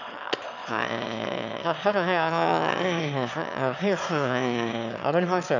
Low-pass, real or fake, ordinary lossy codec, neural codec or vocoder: 7.2 kHz; fake; none; autoencoder, 22.05 kHz, a latent of 192 numbers a frame, VITS, trained on one speaker